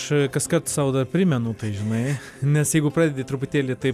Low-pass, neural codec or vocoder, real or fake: 14.4 kHz; none; real